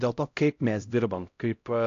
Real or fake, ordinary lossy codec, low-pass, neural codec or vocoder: fake; AAC, 48 kbps; 7.2 kHz; codec, 16 kHz, 0.5 kbps, X-Codec, HuBERT features, trained on LibriSpeech